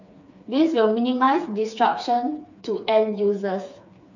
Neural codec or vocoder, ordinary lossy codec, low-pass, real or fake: codec, 16 kHz, 4 kbps, FreqCodec, smaller model; none; 7.2 kHz; fake